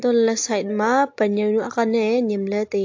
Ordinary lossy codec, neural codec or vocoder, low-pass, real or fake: AAC, 48 kbps; none; 7.2 kHz; real